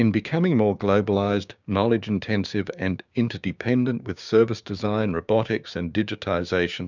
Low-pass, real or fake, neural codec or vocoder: 7.2 kHz; fake; codec, 16 kHz, 6 kbps, DAC